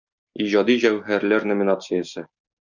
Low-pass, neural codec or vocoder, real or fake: 7.2 kHz; none; real